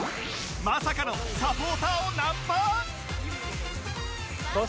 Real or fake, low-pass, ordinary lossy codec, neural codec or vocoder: real; none; none; none